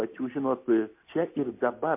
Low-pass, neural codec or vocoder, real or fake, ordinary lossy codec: 3.6 kHz; none; real; MP3, 24 kbps